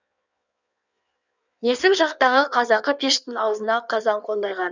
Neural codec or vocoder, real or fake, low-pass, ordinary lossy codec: codec, 16 kHz in and 24 kHz out, 1.1 kbps, FireRedTTS-2 codec; fake; 7.2 kHz; none